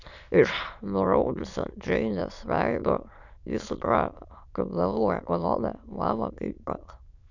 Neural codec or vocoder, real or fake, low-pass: autoencoder, 22.05 kHz, a latent of 192 numbers a frame, VITS, trained on many speakers; fake; 7.2 kHz